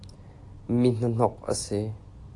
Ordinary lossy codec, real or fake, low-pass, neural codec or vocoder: AAC, 48 kbps; real; 10.8 kHz; none